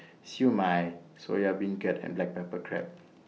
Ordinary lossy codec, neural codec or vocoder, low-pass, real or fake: none; none; none; real